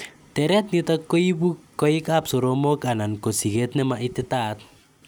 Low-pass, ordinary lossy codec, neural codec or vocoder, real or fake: none; none; none; real